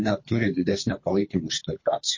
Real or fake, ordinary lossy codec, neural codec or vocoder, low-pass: fake; MP3, 32 kbps; codec, 24 kHz, 3 kbps, HILCodec; 7.2 kHz